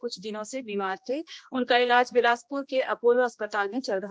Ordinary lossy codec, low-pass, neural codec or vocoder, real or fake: none; none; codec, 16 kHz, 1 kbps, X-Codec, HuBERT features, trained on general audio; fake